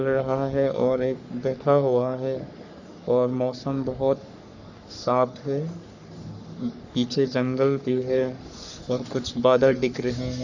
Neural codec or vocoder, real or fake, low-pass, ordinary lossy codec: codec, 44.1 kHz, 3.4 kbps, Pupu-Codec; fake; 7.2 kHz; none